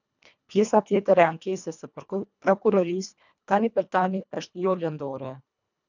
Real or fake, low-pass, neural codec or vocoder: fake; 7.2 kHz; codec, 24 kHz, 1.5 kbps, HILCodec